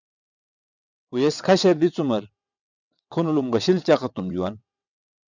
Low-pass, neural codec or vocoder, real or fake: 7.2 kHz; vocoder, 22.05 kHz, 80 mel bands, WaveNeXt; fake